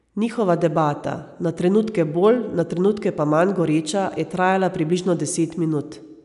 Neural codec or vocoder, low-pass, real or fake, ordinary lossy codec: none; 10.8 kHz; real; none